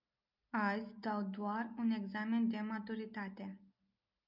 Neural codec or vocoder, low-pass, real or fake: none; 5.4 kHz; real